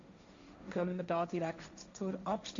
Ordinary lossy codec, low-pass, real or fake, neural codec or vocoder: Opus, 64 kbps; 7.2 kHz; fake; codec, 16 kHz, 1.1 kbps, Voila-Tokenizer